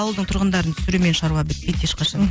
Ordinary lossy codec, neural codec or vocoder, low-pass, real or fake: none; none; none; real